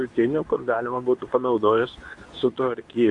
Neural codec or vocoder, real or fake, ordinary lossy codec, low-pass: codec, 24 kHz, 0.9 kbps, WavTokenizer, medium speech release version 2; fake; MP3, 64 kbps; 10.8 kHz